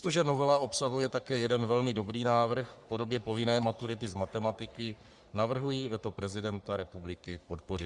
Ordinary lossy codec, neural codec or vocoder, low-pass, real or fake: Opus, 64 kbps; codec, 44.1 kHz, 3.4 kbps, Pupu-Codec; 10.8 kHz; fake